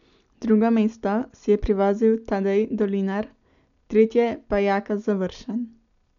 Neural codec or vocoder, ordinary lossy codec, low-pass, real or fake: none; none; 7.2 kHz; real